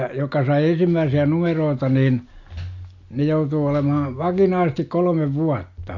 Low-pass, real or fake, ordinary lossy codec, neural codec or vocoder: 7.2 kHz; real; AAC, 48 kbps; none